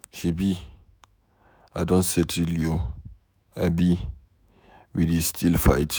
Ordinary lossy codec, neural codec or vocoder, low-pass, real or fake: none; autoencoder, 48 kHz, 128 numbers a frame, DAC-VAE, trained on Japanese speech; none; fake